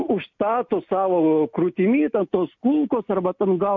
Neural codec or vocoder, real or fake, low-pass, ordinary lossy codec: none; real; 7.2 kHz; MP3, 64 kbps